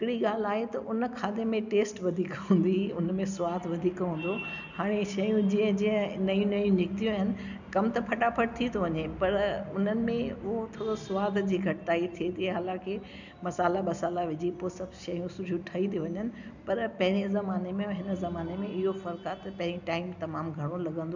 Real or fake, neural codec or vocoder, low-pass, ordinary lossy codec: real; none; 7.2 kHz; none